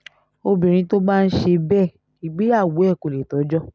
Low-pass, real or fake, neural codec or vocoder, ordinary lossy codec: none; real; none; none